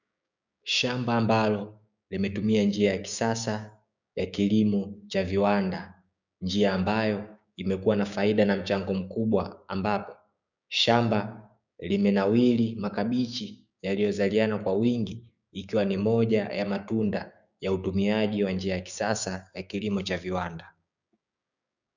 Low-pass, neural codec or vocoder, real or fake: 7.2 kHz; codec, 16 kHz, 6 kbps, DAC; fake